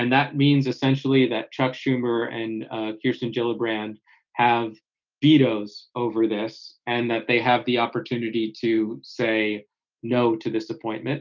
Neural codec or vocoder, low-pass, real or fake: none; 7.2 kHz; real